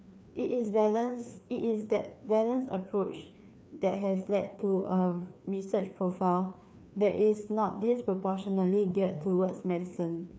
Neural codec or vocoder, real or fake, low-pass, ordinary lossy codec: codec, 16 kHz, 2 kbps, FreqCodec, larger model; fake; none; none